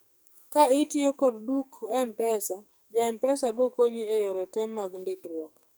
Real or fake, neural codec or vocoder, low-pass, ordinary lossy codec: fake; codec, 44.1 kHz, 2.6 kbps, SNAC; none; none